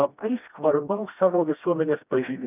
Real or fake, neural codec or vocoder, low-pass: fake; codec, 16 kHz, 1 kbps, FreqCodec, smaller model; 3.6 kHz